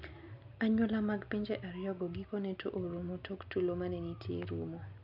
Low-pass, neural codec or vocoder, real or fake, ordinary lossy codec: 5.4 kHz; none; real; AAC, 48 kbps